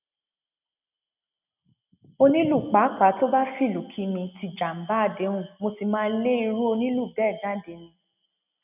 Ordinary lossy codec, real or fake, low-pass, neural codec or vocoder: none; real; 3.6 kHz; none